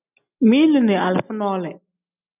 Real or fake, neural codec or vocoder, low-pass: real; none; 3.6 kHz